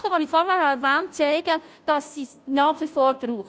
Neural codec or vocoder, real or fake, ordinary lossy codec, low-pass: codec, 16 kHz, 0.5 kbps, FunCodec, trained on Chinese and English, 25 frames a second; fake; none; none